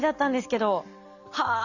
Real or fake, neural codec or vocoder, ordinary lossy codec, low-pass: real; none; none; 7.2 kHz